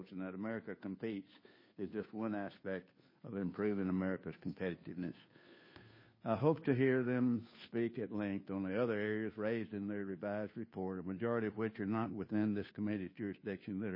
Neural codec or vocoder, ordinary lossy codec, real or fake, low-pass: codec, 16 kHz, 2 kbps, FunCodec, trained on Chinese and English, 25 frames a second; MP3, 24 kbps; fake; 5.4 kHz